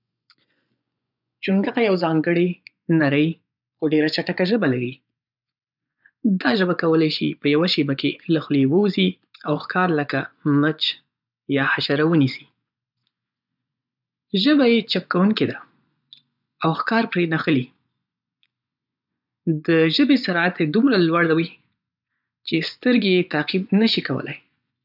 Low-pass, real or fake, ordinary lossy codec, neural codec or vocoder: 5.4 kHz; fake; none; vocoder, 24 kHz, 100 mel bands, Vocos